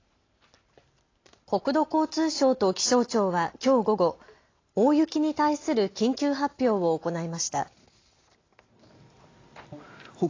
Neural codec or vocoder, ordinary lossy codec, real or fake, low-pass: none; AAC, 32 kbps; real; 7.2 kHz